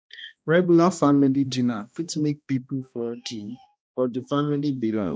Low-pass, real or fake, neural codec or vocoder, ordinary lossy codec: none; fake; codec, 16 kHz, 1 kbps, X-Codec, HuBERT features, trained on balanced general audio; none